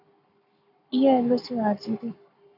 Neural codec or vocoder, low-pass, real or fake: codec, 44.1 kHz, 7.8 kbps, Pupu-Codec; 5.4 kHz; fake